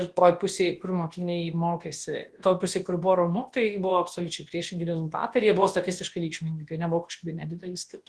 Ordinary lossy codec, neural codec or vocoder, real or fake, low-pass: Opus, 16 kbps; codec, 24 kHz, 0.9 kbps, WavTokenizer, large speech release; fake; 10.8 kHz